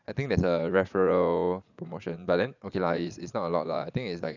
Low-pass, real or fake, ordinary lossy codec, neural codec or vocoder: 7.2 kHz; fake; none; vocoder, 22.05 kHz, 80 mel bands, Vocos